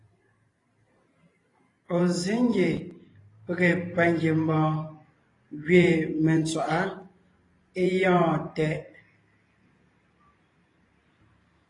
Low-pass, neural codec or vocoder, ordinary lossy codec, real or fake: 10.8 kHz; vocoder, 44.1 kHz, 128 mel bands every 512 samples, BigVGAN v2; AAC, 32 kbps; fake